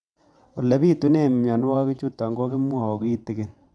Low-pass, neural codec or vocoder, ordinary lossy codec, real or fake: none; vocoder, 22.05 kHz, 80 mel bands, WaveNeXt; none; fake